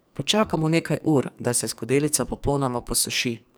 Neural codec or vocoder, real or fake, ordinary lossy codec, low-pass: codec, 44.1 kHz, 2.6 kbps, SNAC; fake; none; none